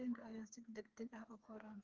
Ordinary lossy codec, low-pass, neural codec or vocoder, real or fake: Opus, 16 kbps; 7.2 kHz; codec, 16 kHz, 4 kbps, FreqCodec, smaller model; fake